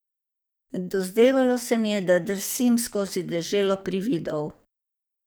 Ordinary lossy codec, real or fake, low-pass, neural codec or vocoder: none; fake; none; codec, 44.1 kHz, 2.6 kbps, SNAC